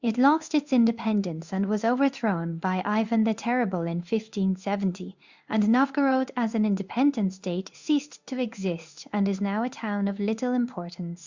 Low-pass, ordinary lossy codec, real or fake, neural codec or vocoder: 7.2 kHz; Opus, 64 kbps; fake; codec, 16 kHz in and 24 kHz out, 1 kbps, XY-Tokenizer